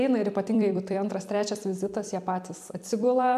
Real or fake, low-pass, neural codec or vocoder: fake; 14.4 kHz; vocoder, 44.1 kHz, 128 mel bands every 256 samples, BigVGAN v2